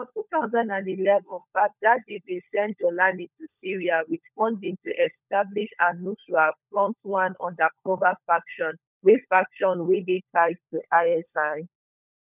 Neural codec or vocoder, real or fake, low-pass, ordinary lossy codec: codec, 16 kHz, 16 kbps, FunCodec, trained on LibriTTS, 50 frames a second; fake; 3.6 kHz; none